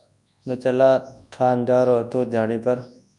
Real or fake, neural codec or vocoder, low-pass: fake; codec, 24 kHz, 0.9 kbps, WavTokenizer, large speech release; 10.8 kHz